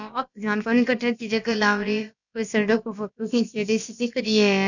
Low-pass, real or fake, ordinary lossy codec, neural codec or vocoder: 7.2 kHz; fake; none; codec, 16 kHz, about 1 kbps, DyCAST, with the encoder's durations